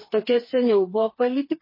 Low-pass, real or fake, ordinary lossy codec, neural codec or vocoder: 5.4 kHz; fake; MP3, 24 kbps; codec, 16 kHz, 4 kbps, FreqCodec, smaller model